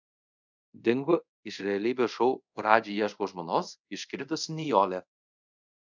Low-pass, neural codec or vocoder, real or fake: 7.2 kHz; codec, 24 kHz, 0.5 kbps, DualCodec; fake